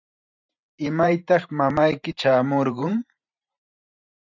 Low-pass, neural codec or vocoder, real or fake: 7.2 kHz; vocoder, 44.1 kHz, 128 mel bands every 512 samples, BigVGAN v2; fake